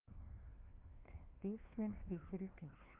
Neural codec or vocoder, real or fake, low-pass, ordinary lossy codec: codec, 16 kHz, 1 kbps, FunCodec, trained on LibriTTS, 50 frames a second; fake; 3.6 kHz; Opus, 24 kbps